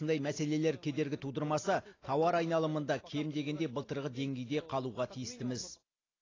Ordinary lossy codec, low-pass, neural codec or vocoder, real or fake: AAC, 32 kbps; 7.2 kHz; none; real